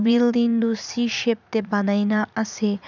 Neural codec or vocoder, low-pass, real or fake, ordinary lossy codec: vocoder, 44.1 kHz, 80 mel bands, Vocos; 7.2 kHz; fake; none